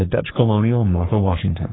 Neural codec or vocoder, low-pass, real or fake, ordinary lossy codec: codec, 44.1 kHz, 3.4 kbps, Pupu-Codec; 7.2 kHz; fake; AAC, 16 kbps